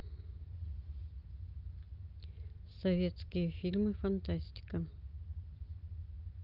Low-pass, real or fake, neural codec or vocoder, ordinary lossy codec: 5.4 kHz; real; none; Opus, 24 kbps